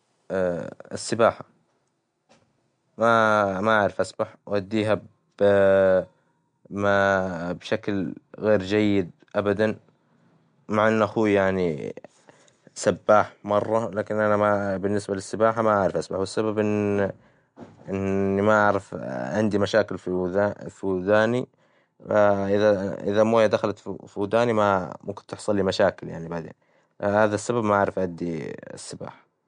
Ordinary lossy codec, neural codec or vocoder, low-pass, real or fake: MP3, 64 kbps; none; 9.9 kHz; real